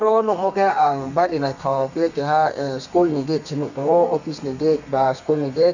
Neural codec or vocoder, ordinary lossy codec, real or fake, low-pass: codec, 32 kHz, 1.9 kbps, SNAC; none; fake; 7.2 kHz